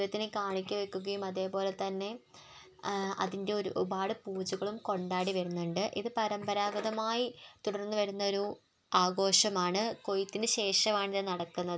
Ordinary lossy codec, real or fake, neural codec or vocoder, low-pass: none; real; none; none